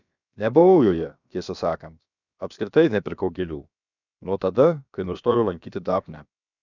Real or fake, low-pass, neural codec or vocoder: fake; 7.2 kHz; codec, 16 kHz, about 1 kbps, DyCAST, with the encoder's durations